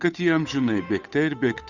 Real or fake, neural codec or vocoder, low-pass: fake; codec, 16 kHz, 8 kbps, FunCodec, trained on Chinese and English, 25 frames a second; 7.2 kHz